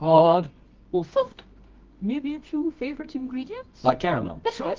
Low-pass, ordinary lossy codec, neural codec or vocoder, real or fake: 7.2 kHz; Opus, 24 kbps; codec, 24 kHz, 0.9 kbps, WavTokenizer, medium music audio release; fake